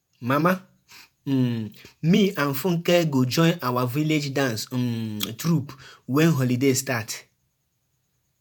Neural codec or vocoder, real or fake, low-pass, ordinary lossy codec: vocoder, 48 kHz, 128 mel bands, Vocos; fake; none; none